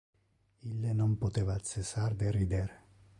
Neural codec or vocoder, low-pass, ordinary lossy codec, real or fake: none; 10.8 kHz; AAC, 64 kbps; real